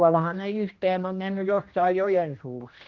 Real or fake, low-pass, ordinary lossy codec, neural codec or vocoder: fake; 7.2 kHz; Opus, 24 kbps; codec, 16 kHz, 1 kbps, X-Codec, HuBERT features, trained on general audio